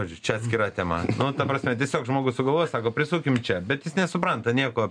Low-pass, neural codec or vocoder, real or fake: 9.9 kHz; none; real